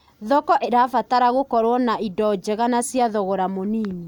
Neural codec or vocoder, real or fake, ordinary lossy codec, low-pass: none; real; none; 19.8 kHz